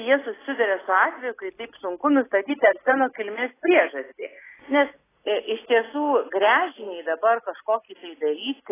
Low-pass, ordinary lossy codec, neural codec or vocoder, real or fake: 3.6 kHz; AAC, 16 kbps; none; real